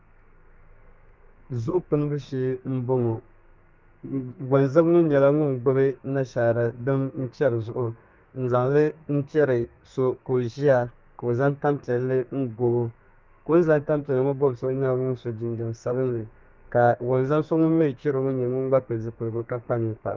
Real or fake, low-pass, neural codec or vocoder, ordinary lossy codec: fake; 7.2 kHz; codec, 32 kHz, 1.9 kbps, SNAC; Opus, 24 kbps